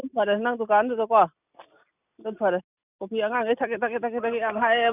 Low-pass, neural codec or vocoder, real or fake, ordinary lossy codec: 3.6 kHz; none; real; none